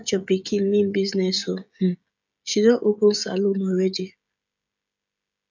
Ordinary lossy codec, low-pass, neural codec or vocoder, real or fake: none; 7.2 kHz; codec, 16 kHz, 16 kbps, FreqCodec, smaller model; fake